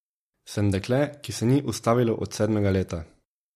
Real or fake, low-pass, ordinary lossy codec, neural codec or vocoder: real; 14.4 kHz; MP3, 64 kbps; none